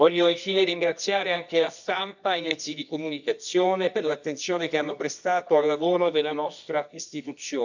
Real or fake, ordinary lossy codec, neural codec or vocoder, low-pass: fake; none; codec, 24 kHz, 0.9 kbps, WavTokenizer, medium music audio release; 7.2 kHz